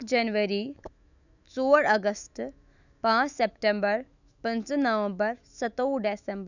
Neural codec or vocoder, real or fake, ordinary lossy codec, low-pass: autoencoder, 48 kHz, 128 numbers a frame, DAC-VAE, trained on Japanese speech; fake; none; 7.2 kHz